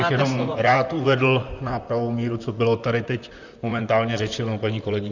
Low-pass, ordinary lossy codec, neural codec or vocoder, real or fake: 7.2 kHz; Opus, 64 kbps; vocoder, 44.1 kHz, 128 mel bands, Pupu-Vocoder; fake